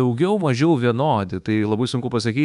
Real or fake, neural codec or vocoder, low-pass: fake; codec, 24 kHz, 1.2 kbps, DualCodec; 10.8 kHz